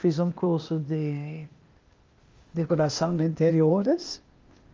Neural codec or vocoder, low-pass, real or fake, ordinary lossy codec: codec, 16 kHz, 0.8 kbps, ZipCodec; 7.2 kHz; fake; Opus, 32 kbps